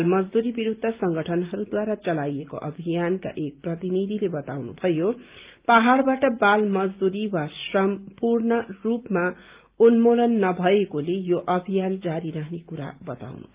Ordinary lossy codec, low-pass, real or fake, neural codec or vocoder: Opus, 24 kbps; 3.6 kHz; real; none